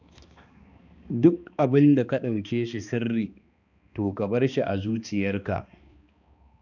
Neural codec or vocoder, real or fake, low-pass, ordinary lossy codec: codec, 16 kHz, 2 kbps, X-Codec, HuBERT features, trained on balanced general audio; fake; 7.2 kHz; none